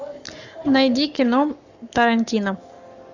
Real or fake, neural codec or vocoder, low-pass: fake; codec, 44.1 kHz, 7.8 kbps, Pupu-Codec; 7.2 kHz